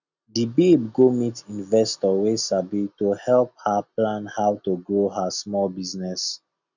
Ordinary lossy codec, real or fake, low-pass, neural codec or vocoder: none; real; 7.2 kHz; none